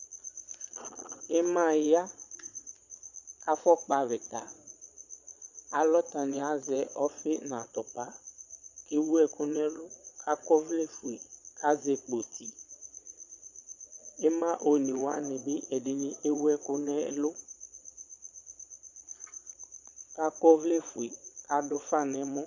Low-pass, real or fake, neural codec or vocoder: 7.2 kHz; fake; vocoder, 22.05 kHz, 80 mel bands, Vocos